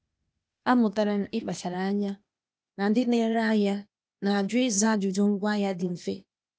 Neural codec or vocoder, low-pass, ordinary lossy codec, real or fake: codec, 16 kHz, 0.8 kbps, ZipCodec; none; none; fake